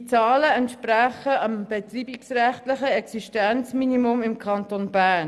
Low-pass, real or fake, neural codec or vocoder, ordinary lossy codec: none; real; none; none